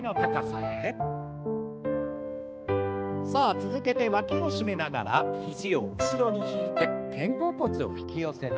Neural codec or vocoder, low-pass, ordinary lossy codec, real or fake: codec, 16 kHz, 2 kbps, X-Codec, HuBERT features, trained on balanced general audio; none; none; fake